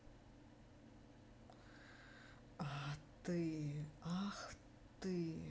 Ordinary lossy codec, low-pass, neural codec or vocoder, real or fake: none; none; none; real